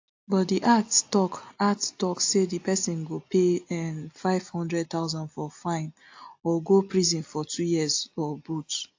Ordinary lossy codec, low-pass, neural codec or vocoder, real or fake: AAC, 48 kbps; 7.2 kHz; none; real